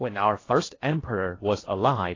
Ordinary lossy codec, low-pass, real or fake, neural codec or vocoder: AAC, 32 kbps; 7.2 kHz; fake; codec, 16 kHz in and 24 kHz out, 0.6 kbps, FocalCodec, streaming, 2048 codes